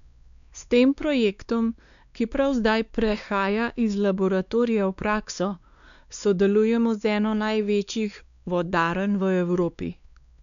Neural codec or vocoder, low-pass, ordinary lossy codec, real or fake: codec, 16 kHz, 2 kbps, X-Codec, WavLM features, trained on Multilingual LibriSpeech; 7.2 kHz; none; fake